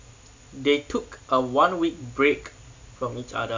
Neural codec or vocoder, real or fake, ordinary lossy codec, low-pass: none; real; MP3, 64 kbps; 7.2 kHz